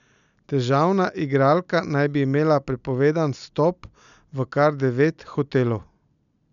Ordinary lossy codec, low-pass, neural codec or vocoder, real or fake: none; 7.2 kHz; none; real